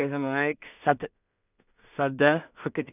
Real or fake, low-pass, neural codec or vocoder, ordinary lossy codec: fake; 3.6 kHz; codec, 16 kHz in and 24 kHz out, 0.4 kbps, LongCat-Audio-Codec, two codebook decoder; none